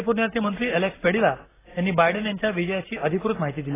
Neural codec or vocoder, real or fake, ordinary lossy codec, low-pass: none; real; AAC, 16 kbps; 3.6 kHz